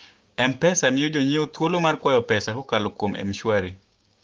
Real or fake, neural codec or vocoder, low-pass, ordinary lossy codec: fake; codec, 16 kHz, 6 kbps, DAC; 7.2 kHz; Opus, 24 kbps